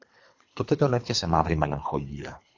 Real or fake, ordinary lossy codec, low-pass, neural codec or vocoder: fake; AAC, 48 kbps; 7.2 kHz; codec, 24 kHz, 3 kbps, HILCodec